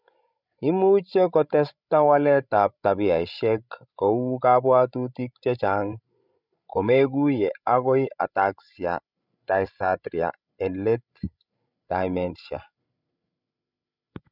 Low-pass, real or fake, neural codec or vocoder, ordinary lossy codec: 5.4 kHz; fake; codec, 16 kHz, 16 kbps, FreqCodec, larger model; none